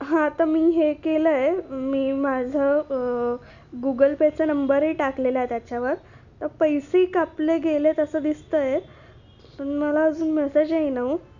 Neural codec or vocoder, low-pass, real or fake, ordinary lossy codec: none; 7.2 kHz; real; none